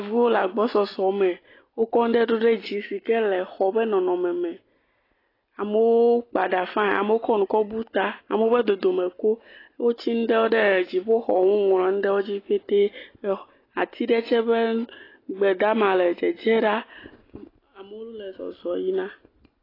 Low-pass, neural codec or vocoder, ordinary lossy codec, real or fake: 5.4 kHz; none; AAC, 24 kbps; real